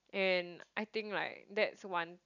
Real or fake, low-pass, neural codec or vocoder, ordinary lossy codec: real; 7.2 kHz; none; none